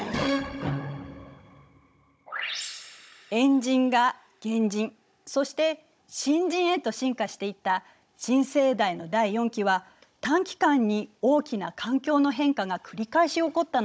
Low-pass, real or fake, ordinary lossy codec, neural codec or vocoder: none; fake; none; codec, 16 kHz, 16 kbps, FunCodec, trained on Chinese and English, 50 frames a second